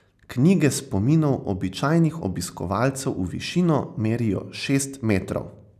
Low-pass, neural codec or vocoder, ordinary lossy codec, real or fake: 14.4 kHz; none; none; real